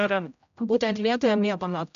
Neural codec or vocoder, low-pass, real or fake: codec, 16 kHz, 0.5 kbps, X-Codec, HuBERT features, trained on general audio; 7.2 kHz; fake